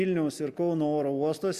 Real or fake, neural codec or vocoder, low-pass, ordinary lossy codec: real; none; 14.4 kHz; Opus, 64 kbps